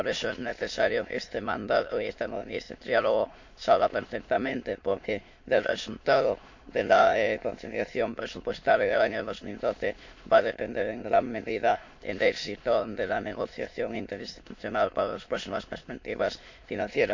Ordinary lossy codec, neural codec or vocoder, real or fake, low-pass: MP3, 48 kbps; autoencoder, 22.05 kHz, a latent of 192 numbers a frame, VITS, trained on many speakers; fake; 7.2 kHz